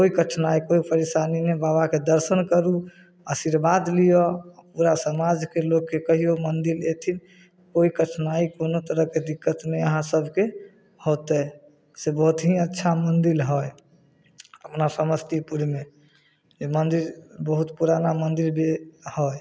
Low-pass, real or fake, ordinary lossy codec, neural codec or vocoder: none; real; none; none